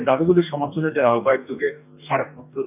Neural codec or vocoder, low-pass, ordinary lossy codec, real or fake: codec, 44.1 kHz, 2.6 kbps, DAC; 3.6 kHz; none; fake